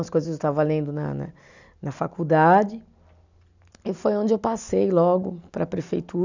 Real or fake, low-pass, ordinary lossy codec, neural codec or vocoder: real; 7.2 kHz; none; none